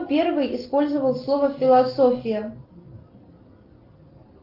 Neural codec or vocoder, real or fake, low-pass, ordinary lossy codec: none; real; 5.4 kHz; Opus, 32 kbps